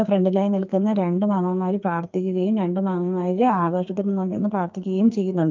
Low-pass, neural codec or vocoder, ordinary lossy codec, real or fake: 7.2 kHz; codec, 44.1 kHz, 2.6 kbps, SNAC; Opus, 24 kbps; fake